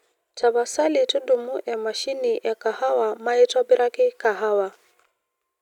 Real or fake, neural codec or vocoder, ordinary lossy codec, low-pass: real; none; none; 19.8 kHz